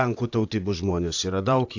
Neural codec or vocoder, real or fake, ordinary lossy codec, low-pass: vocoder, 44.1 kHz, 128 mel bands, Pupu-Vocoder; fake; AAC, 48 kbps; 7.2 kHz